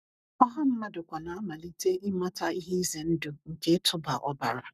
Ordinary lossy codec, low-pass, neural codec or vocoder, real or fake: none; 14.4 kHz; codec, 44.1 kHz, 7.8 kbps, Pupu-Codec; fake